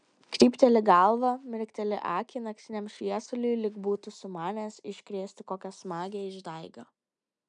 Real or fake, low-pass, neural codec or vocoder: real; 9.9 kHz; none